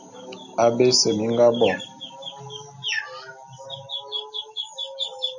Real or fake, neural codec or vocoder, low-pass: real; none; 7.2 kHz